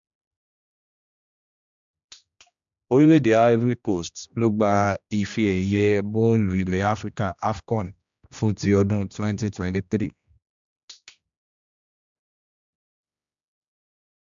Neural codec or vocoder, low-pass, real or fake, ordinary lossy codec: codec, 16 kHz, 1 kbps, X-Codec, HuBERT features, trained on general audio; 7.2 kHz; fake; MP3, 64 kbps